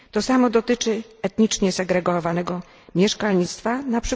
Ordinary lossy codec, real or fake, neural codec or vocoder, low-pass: none; real; none; none